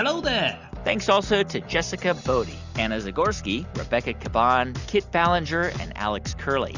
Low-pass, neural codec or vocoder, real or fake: 7.2 kHz; none; real